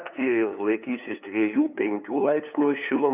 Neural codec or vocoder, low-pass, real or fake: codec, 16 kHz, 2 kbps, FunCodec, trained on LibriTTS, 25 frames a second; 3.6 kHz; fake